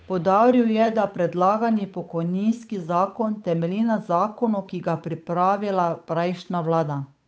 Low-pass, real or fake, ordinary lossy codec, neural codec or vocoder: none; fake; none; codec, 16 kHz, 8 kbps, FunCodec, trained on Chinese and English, 25 frames a second